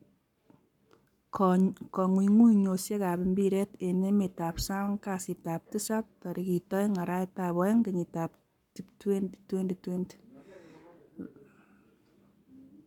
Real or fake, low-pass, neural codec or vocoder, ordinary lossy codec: fake; 19.8 kHz; codec, 44.1 kHz, 7.8 kbps, Pupu-Codec; none